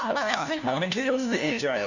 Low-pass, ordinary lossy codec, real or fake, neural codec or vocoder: 7.2 kHz; none; fake; codec, 16 kHz, 1 kbps, FunCodec, trained on LibriTTS, 50 frames a second